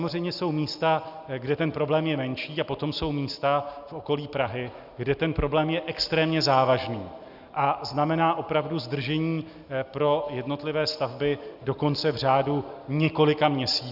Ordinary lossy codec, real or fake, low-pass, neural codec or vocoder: Opus, 64 kbps; real; 5.4 kHz; none